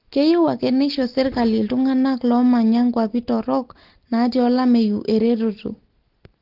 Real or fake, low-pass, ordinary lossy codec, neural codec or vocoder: real; 5.4 kHz; Opus, 16 kbps; none